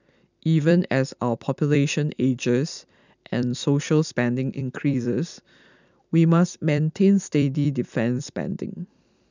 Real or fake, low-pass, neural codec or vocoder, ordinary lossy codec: fake; 7.2 kHz; vocoder, 44.1 kHz, 128 mel bands every 256 samples, BigVGAN v2; none